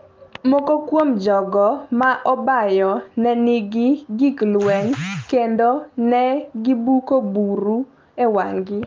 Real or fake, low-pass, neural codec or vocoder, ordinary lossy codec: real; 7.2 kHz; none; Opus, 24 kbps